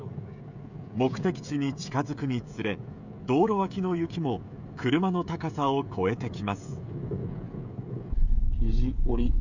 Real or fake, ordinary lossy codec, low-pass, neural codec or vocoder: fake; none; 7.2 kHz; codec, 16 kHz, 16 kbps, FreqCodec, smaller model